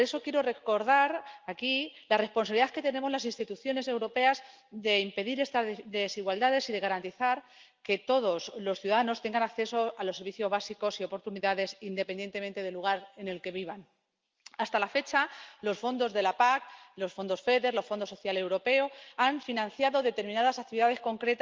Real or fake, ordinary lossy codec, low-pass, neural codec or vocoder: real; Opus, 32 kbps; 7.2 kHz; none